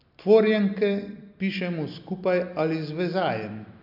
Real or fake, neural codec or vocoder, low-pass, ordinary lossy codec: real; none; 5.4 kHz; MP3, 48 kbps